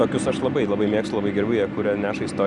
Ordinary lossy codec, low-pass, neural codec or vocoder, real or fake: Opus, 64 kbps; 10.8 kHz; none; real